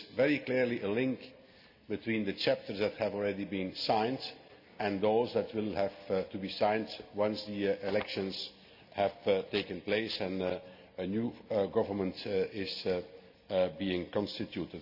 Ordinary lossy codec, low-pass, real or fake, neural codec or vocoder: none; 5.4 kHz; real; none